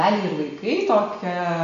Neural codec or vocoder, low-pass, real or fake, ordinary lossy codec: none; 7.2 kHz; real; MP3, 48 kbps